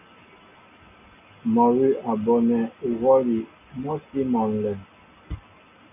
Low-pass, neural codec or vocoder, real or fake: 3.6 kHz; none; real